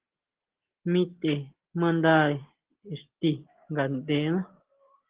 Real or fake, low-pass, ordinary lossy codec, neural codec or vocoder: real; 3.6 kHz; Opus, 16 kbps; none